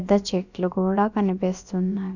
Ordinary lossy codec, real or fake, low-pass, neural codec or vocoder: none; fake; 7.2 kHz; codec, 16 kHz, about 1 kbps, DyCAST, with the encoder's durations